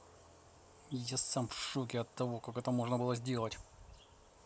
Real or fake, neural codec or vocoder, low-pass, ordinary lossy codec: real; none; none; none